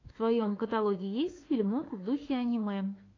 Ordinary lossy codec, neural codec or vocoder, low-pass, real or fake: AAC, 32 kbps; autoencoder, 48 kHz, 32 numbers a frame, DAC-VAE, trained on Japanese speech; 7.2 kHz; fake